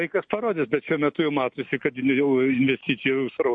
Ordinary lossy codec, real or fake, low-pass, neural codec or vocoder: MP3, 48 kbps; real; 9.9 kHz; none